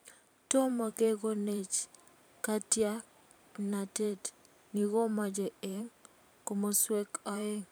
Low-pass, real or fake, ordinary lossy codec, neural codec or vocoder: none; fake; none; vocoder, 44.1 kHz, 128 mel bands every 512 samples, BigVGAN v2